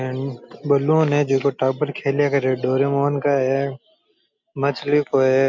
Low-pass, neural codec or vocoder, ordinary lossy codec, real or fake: 7.2 kHz; none; MP3, 48 kbps; real